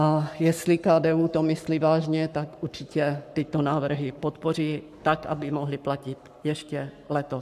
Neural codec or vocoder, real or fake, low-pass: codec, 44.1 kHz, 7.8 kbps, Pupu-Codec; fake; 14.4 kHz